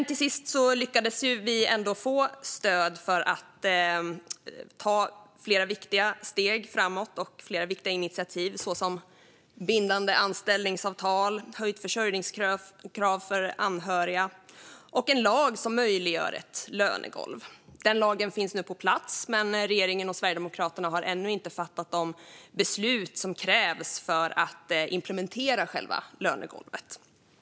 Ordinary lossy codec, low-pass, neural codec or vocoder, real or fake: none; none; none; real